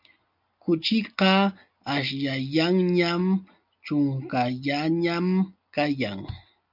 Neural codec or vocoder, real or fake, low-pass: none; real; 5.4 kHz